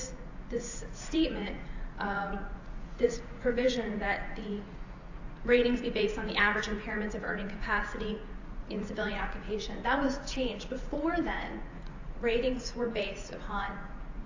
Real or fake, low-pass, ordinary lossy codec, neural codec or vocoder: fake; 7.2 kHz; AAC, 48 kbps; vocoder, 44.1 kHz, 80 mel bands, Vocos